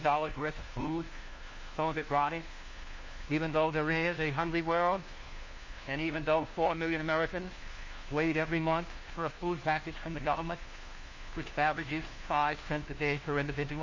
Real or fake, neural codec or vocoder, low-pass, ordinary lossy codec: fake; codec, 16 kHz, 1 kbps, FunCodec, trained on LibriTTS, 50 frames a second; 7.2 kHz; MP3, 32 kbps